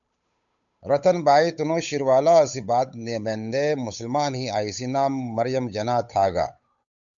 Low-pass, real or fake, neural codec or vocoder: 7.2 kHz; fake; codec, 16 kHz, 8 kbps, FunCodec, trained on Chinese and English, 25 frames a second